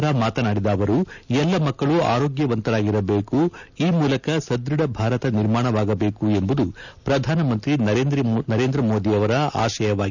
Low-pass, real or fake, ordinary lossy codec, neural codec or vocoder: 7.2 kHz; real; none; none